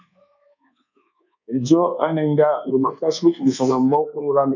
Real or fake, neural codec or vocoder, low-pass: fake; codec, 24 kHz, 1.2 kbps, DualCodec; 7.2 kHz